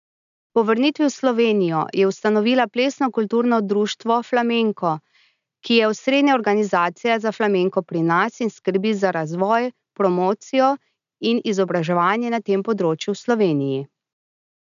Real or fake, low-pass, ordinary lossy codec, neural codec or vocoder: real; 7.2 kHz; none; none